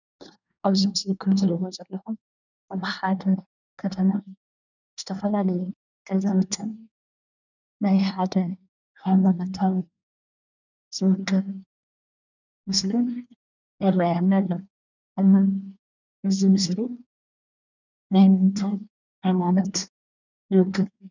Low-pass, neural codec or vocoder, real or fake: 7.2 kHz; codec, 24 kHz, 1 kbps, SNAC; fake